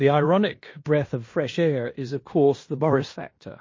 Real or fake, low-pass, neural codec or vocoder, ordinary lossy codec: fake; 7.2 kHz; codec, 16 kHz in and 24 kHz out, 0.9 kbps, LongCat-Audio-Codec, fine tuned four codebook decoder; MP3, 32 kbps